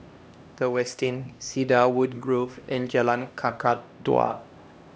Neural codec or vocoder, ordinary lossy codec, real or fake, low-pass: codec, 16 kHz, 1 kbps, X-Codec, HuBERT features, trained on LibriSpeech; none; fake; none